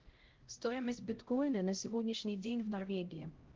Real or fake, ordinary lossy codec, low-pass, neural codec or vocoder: fake; Opus, 16 kbps; 7.2 kHz; codec, 16 kHz, 0.5 kbps, X-Codec, HuBERT features, trained on LibriSpeech